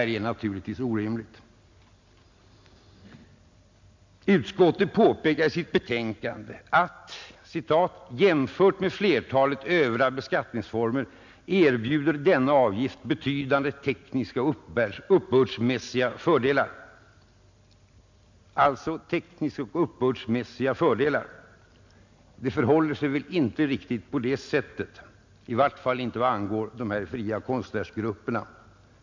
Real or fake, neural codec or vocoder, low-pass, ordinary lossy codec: real; none; 7.2 kHz; MP3, 64 kbps